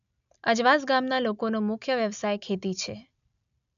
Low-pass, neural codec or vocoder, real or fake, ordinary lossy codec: 7.2 kHz; none; real; MP3, 96 kbps